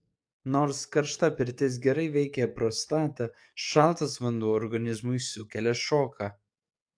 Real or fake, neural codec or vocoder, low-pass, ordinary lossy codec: fake; codec, 44.1 kHz, 7.8 kbps, DAC; 9.9 kHz; AAC, 64 kbps